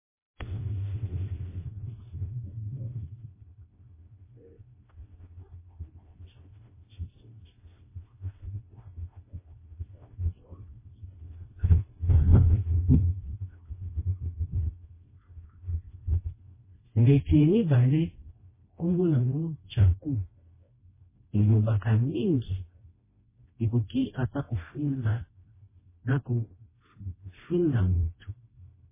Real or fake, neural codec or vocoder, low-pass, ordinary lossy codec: fake; codec, 16 kHz, 1 kbps, FreqCodec, smaller model; 3.6 kHz; MP3, 16 kbps